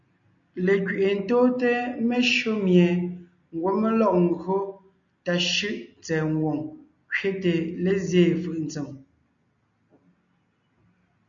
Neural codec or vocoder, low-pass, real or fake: none; 7.2 kHz; real